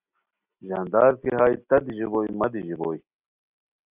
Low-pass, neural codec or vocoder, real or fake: 3.6 kHz; none; real